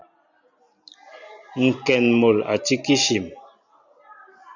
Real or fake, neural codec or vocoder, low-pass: real; none; 7.2 kHz